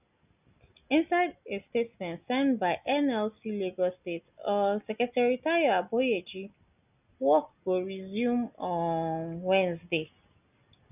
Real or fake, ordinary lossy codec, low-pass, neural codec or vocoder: real; none; 3.6 kHz; none